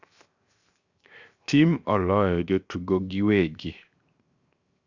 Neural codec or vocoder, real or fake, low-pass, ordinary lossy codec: codec, 16 kHz, 0.7 kbps, FocalCodec; fake; 7.2 kHz; Opus, 64 kbps